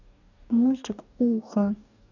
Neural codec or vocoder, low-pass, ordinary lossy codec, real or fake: codec, 44.1 kHz, 2.6 kbps, DAC; 7.2 kHz; none; fake